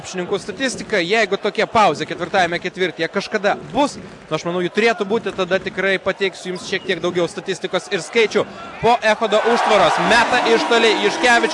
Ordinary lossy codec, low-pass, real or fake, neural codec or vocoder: AAC, 64 kbps; 10.8 kHz; real; none